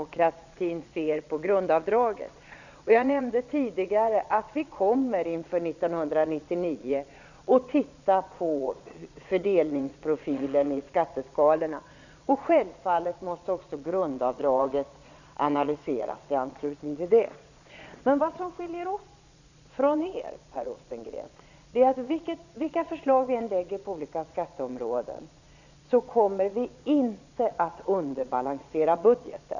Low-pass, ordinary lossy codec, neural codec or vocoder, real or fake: 7.2 kHz; none; vocoder, 22.05 kHz, 80 mel bands, Vocos; fake